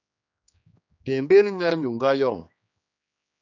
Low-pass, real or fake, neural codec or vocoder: 7.2 kHz; fake; codec, 16 kHz, 2 kbps, X-Codec, HuBERT features, trained on general audio